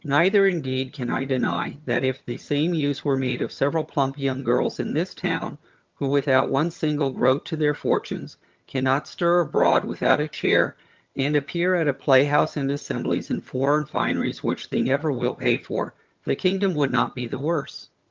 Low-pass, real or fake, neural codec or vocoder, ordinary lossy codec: 7.2 kHz; fake; vocoder, 22.05 kHz, 80 mel bands, HiFi-GAN; Opus, 32 kbps